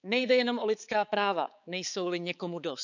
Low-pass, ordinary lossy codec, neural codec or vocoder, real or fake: 7.2 kHz; none; codec, 16 kHz, 4 kbps, X-Codec, HuBERT features, trained on balanced general audio; fake